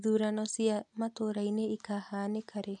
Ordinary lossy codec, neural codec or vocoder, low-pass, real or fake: none; none; 10.8 kHz; real